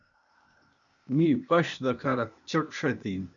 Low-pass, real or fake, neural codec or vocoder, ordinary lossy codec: 7.2 kHz; fake; codec, 16 kHz, 0.8 kbps, ZipCodec; MP3, 96 kbps